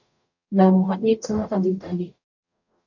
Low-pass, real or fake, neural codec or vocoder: 7.2 kHz; fake; codec, 44.1 kHz, 0.9 kbps, DAC